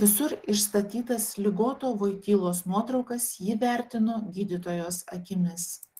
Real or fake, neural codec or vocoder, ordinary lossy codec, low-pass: real; none; Opus, 16 kbps; 14.4 kHz